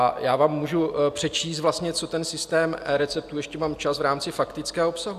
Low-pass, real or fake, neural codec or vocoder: 14.4 kHz; real; none